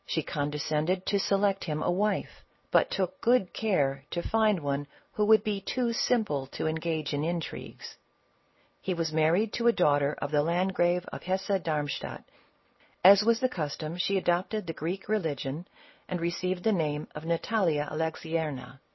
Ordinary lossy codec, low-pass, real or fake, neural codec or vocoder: MP3, 24 kbps; 7.2 kHz; real; none